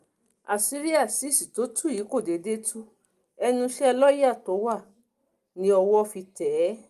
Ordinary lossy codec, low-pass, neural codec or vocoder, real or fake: Opus, 32 kbps; 14.4 kHz; none; real